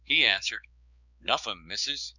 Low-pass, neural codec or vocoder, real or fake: 7.2 kHz; codec, 16 kHz, 4 kbps, X-Codec, HuBERT features, trained on balanced general audio; fake